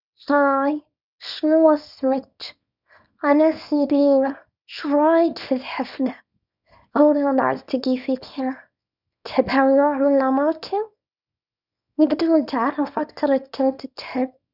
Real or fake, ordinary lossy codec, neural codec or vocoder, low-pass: fake; none; codec, 24 kHz, 0.9 kbps, WavTokenizer, small release; 5.4 kHz